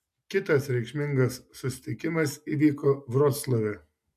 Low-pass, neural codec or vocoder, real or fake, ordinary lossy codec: 14.4 kHz; none; real; AAC, 96 kbps